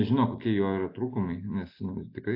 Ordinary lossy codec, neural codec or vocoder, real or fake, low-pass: AAC, 32 kbps; none; real; 5.4 kHz